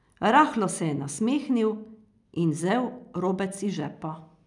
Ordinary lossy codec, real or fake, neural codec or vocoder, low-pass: none; real; none; 10.8 kHz